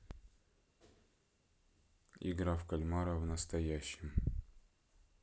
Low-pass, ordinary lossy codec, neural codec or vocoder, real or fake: none; none; none; real